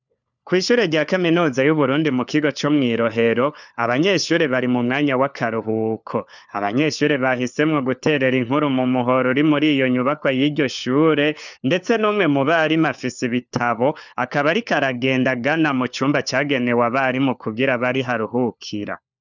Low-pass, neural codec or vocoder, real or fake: 7.2 kHz; codec, 16 kHz, 4 kbps, FunCodec, trained on LibriTTS, 50 frames a second; fake